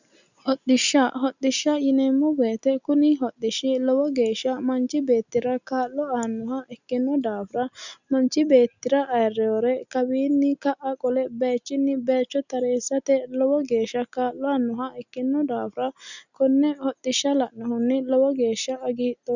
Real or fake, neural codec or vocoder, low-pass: real; none; 7.2 kHz